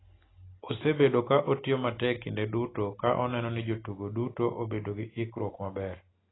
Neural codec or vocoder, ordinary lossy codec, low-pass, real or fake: none; AAC, 16 kbps; 7.2 kHz; real